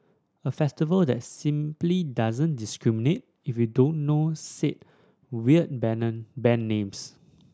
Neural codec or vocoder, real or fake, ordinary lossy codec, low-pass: none; real; none; none